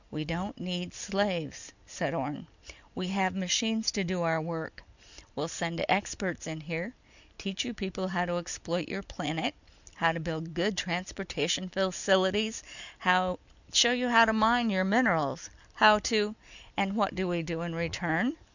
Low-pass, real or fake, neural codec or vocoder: 7.2 kHz; real; none